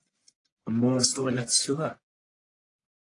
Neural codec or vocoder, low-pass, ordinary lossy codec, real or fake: codec, 44.1 kHz, 1.7 kbps, Pupu-Codec; 10.8 kHz; AAC, 48 kbps; fake